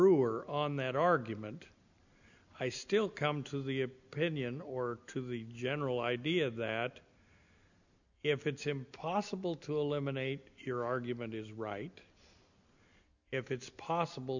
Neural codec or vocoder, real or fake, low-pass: none; real; 7.2 kHz